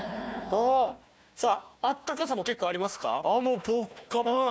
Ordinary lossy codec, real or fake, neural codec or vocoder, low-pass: none; fake; codec, 16 kHz, 1 kbps, FunCodec, trained on Chinese and English, 50 frames a second; none